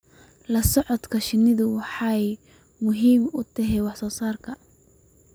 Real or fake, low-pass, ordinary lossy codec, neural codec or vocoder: real; none; none; none